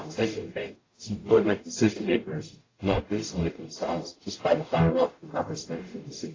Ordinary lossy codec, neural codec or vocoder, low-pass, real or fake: AAC, 32 kbps; codec, 44.1 kHz, 0.9 kbps, DAC; 7.2 kHz; fake